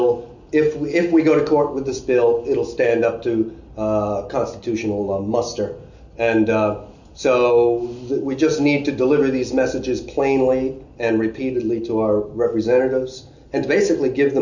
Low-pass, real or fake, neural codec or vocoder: 7.2 kHz; real; none